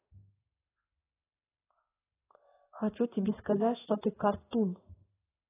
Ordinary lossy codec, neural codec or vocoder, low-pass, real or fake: AAC, 16 kbps; codec, 16 kHz in and 24 kHz out, 1 kbps, XY-Tokenizer; 3.6 kHz; fake